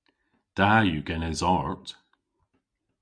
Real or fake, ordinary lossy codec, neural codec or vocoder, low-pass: real; Opus, 64 kbps; none; 9.9 kHz